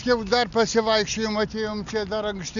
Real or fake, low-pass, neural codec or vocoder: real; 7.2 kHz; none